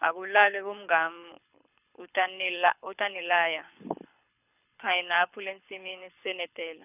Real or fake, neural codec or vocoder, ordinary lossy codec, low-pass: fake; codec, 24 kHz, 6 kbps, HILCodec; none; 3.6 kHz